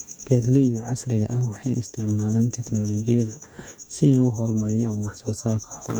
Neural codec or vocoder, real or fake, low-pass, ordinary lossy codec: codec, 44.1 kHz, 2.6 kbps, DAC; fake; none; none